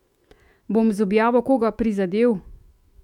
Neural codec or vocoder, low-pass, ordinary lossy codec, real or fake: autoencoder, 48 kHz, 128 numbers a frame, DAC-VAE, trained on Japanese speech; 19.8 kHz; MP3, 96 kbps; fake